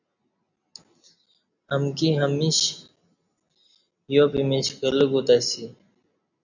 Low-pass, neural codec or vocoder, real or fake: 7.2 kHz; none; real